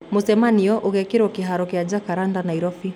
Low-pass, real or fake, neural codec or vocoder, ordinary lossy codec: 19.8 kHz; real; none; none